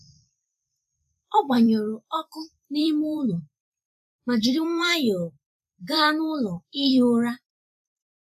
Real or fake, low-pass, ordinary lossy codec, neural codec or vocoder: fake; 14.4 kHz; none; vocoder, 44.1 kHz, 128 mel bands every 256 samples, BigVGAN v2